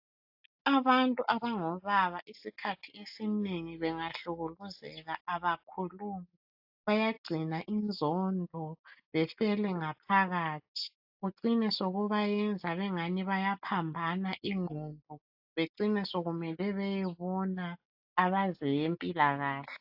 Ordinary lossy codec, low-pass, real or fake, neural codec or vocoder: AAC, 48 kbps; 5.4 kHz; real; none